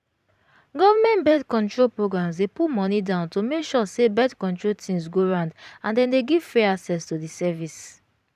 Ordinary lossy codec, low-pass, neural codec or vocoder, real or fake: none; 14.4 kHz; vocoder, 44.1 kHz, 128 mel bands every 512 samples, BigVGAN v2; fake